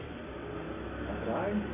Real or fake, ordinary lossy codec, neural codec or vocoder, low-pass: real; MP3, 24 kbps; none; 3.6 kHz